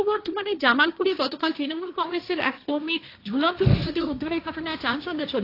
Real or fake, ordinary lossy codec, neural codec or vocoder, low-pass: fake; AAC, 32 kbps; codec, 16 kHz, 1.1 kbps, Voila-Tokenizer; 5.4 kHz